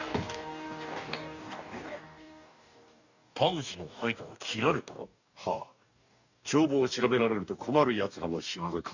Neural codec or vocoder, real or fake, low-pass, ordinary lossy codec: codec, 44.1 kHz, 2.6 kbps, DAC; fake; 7.2 kHz; none